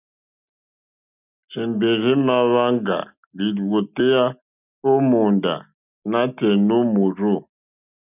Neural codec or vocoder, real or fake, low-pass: none; real; 3.6 kHz